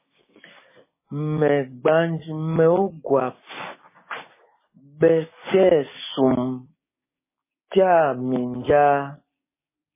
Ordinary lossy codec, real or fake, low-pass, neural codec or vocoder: MP3, 16 kbps; fake; 3.6 kHz; codec, 16 kHz, 6 kbps, DAC